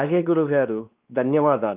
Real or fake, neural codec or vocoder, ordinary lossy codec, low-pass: fake; codec, 16 kHz, 2 kbps, X-Codec, WavLM features, trained on Multilingual LibriSpeech; Opus, 24 kbps; 3.6 kHz